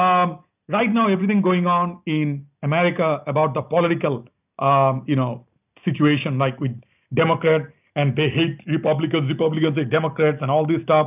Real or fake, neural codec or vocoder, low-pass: real; none; 3.6 kHz